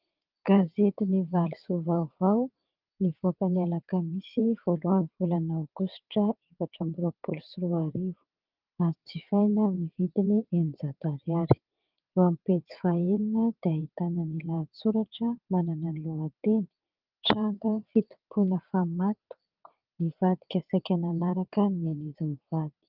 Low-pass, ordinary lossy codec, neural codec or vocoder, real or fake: 5.4 kHz; Opus, 32 kbps; vocoder, 22.05 kHz, 80 mel bands, WaveNeXt; fake